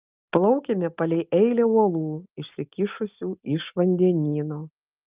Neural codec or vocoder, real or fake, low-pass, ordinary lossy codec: none; real; 3.6 kHz; Opus, 24 kbps